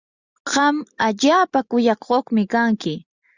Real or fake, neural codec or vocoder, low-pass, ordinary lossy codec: real; none; 7.2 kHz; Opus, 64 kbps